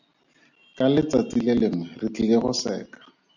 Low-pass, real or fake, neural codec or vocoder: 7.2 kHz; real; none